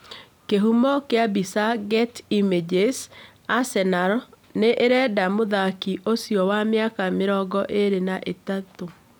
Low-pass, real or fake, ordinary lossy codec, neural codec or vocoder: none; real; none; none